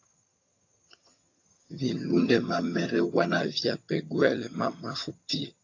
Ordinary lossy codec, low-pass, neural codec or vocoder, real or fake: AAC, 48 kbps; 7.2 kHz; vocoder, 22.05 kHz, 80 mel bands, HiFi-GAN; fake